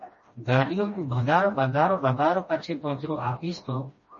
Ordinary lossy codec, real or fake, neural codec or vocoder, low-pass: MP3, 32 kbps; fake; codec, 16 kHz, 1 kbps, FreqCodec, smaller model; 7.2 kHz